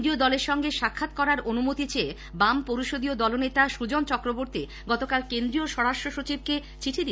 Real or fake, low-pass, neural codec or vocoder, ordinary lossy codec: real; none; none; none